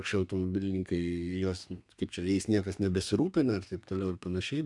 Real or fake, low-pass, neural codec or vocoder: fake; 10.8 kHz; codec, 32 kHz, 1.9 kbps, SNAC